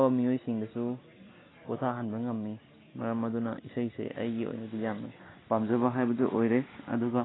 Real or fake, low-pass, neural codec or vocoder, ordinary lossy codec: real; 7.2 kHz; none; AAC, 16 kbps